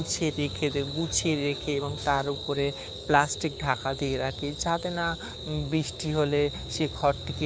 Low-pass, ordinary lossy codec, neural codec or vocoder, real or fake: none; none; codec, 16 kHz, 6 kbps, DAC; fake